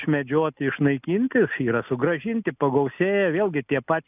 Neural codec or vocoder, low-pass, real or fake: none; 3.6 kHz; real